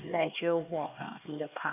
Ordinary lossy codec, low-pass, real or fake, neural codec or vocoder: none; 3.6 kHz; fake; codec, 16 kHz, 2 kbps, X-Codec, HuBERT features, trained on LibriSpeech